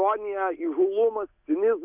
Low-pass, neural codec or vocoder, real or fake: 3.6 kHz; none; real